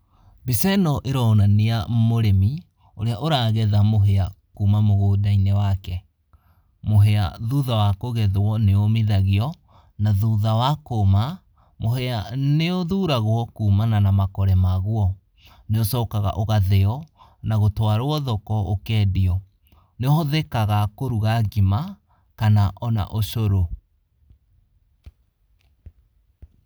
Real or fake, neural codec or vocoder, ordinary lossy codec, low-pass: real; none; none; none